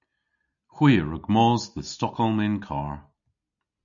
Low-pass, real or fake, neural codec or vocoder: 7.2 kHz; real; none